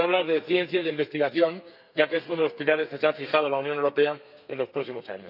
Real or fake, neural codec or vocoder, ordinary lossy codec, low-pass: fake; codec, 32 kHz, 1.9 kbps, SNAC; none; 5.4 kHz